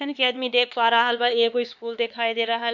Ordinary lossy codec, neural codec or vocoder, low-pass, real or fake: none; codec, 16 kHz, 4 kbps, X-Codec, HuBERT features, trained on LibriSpeech; 7.2 kHz; fake